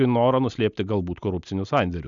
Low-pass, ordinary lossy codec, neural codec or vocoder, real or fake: 7.2 kHz; MP3, 96 kbps; none; real